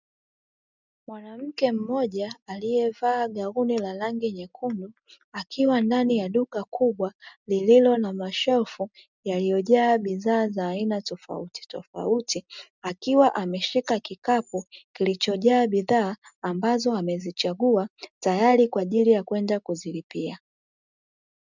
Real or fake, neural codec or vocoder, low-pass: real; none; 7.2 kHz